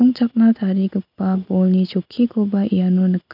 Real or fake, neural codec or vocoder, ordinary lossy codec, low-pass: real; none; none; 5.4 kHz